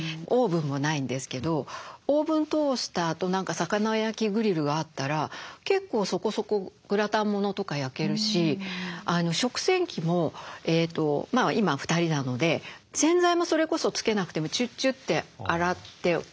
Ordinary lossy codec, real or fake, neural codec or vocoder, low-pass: none; real; none; none